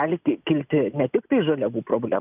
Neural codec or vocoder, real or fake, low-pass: none; real; 3.6 kHz